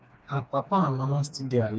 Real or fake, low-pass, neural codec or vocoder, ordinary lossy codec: fake; none; codec, 16 kHz, 2 kbps, FreqCodec, smaller model; none